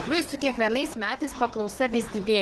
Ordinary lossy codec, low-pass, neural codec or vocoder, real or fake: Opus, 16 kbps; 10.8 kHz; codec, 24 kHz, 1 kbps, SNAC; fake